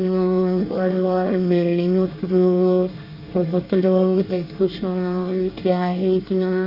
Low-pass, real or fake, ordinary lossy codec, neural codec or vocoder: 5.4 kHz; fake; Opus, 64 kbps; codec, 24 kHz, 1 kbps, SNAC